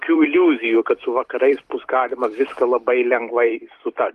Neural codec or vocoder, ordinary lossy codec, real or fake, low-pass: vocoder, 44.1 kHz, 128 mel bands every 256 samples, BigVGAN v2; Opus, 32 kbps; fake; 14.4 kHz